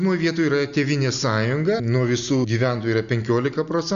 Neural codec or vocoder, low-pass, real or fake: none; 7.2 kHz; real